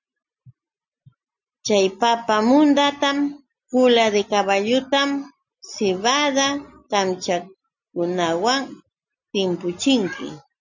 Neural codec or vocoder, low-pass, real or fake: none; 7.2 kHz; real